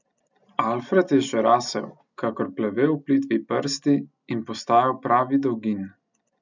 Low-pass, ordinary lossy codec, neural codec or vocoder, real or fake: 7.2 kHz; none; none; real